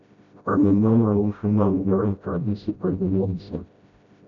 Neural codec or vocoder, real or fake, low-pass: codec, 16 kHz, 0.5 kbps, FreqCodec, smaller model; fake; 7.2 kHz